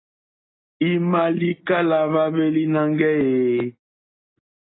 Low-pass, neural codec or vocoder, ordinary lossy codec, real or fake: 7.2 kHz; none; AAC, 16 kbps; real